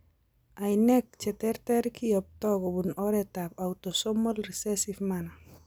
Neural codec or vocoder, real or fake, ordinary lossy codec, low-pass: none; real; none; none